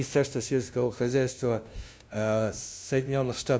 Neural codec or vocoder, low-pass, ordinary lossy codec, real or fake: codec, 16 kHz, 0.5 kbps, FunCodec, trained on LibriTTS, 25 frames a second; none; none; fake